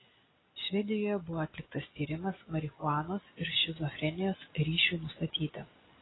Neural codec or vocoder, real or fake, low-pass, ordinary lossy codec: none; real; 7.2 kHz; AAC, 16 kbps